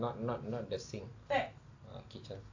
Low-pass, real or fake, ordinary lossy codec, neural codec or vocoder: 7.2 kHz; real; AAC, 48 kbps; none